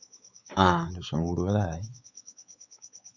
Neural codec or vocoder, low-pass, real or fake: codec, 16 kHz, 4 kbps, X-Codec, WavLM features, trained on Multilingual LibriSpeech; 7.2 kHz; fake